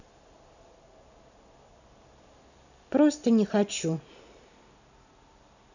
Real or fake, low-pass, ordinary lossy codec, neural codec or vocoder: real; 7.2 kHz; none; none